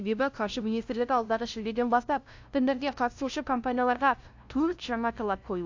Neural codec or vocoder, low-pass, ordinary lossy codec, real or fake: codec, 16 kHz, 0.5 kbps, FunCodec, trained on LibriTTS, 25 frames a second; 7.2 kHz; AAC, 48 kbps; fake